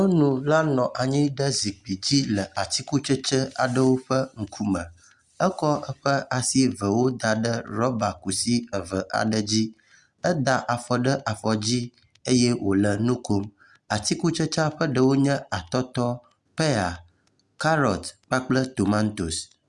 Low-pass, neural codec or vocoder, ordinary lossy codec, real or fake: 10.8 kHz; none; Opus, 64 kbps; real